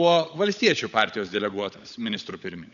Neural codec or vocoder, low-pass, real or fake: codec, 16 kHz, 16 kbps, FunCodec, trained on LibriTTS, 50 frames a second; 7.2 kHz; fake